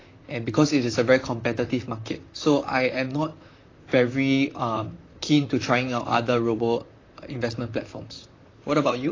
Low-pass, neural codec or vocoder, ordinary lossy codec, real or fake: 7.2 kHz; vocoder, 44.1 kHz, 128 mel bands, Pupu-Vocoder; AAC, 32 kbps; fake